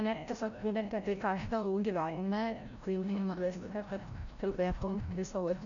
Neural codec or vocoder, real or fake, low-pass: codec, 16 kHz, 0.5 kbps, FreqCodec, larger model; fake; 7.2 kHz